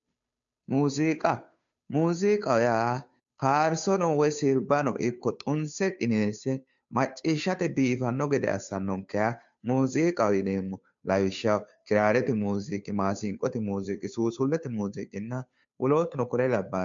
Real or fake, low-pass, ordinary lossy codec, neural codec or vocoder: fake; 7.2 kHz; MP3, 64 kbps; codec, 16 kHz, 2 kbps, FunCodec, trained on Chinese and English, 25 frames a second